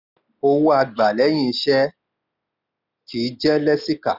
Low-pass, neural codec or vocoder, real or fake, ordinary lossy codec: 5.4 kHz; none; real; none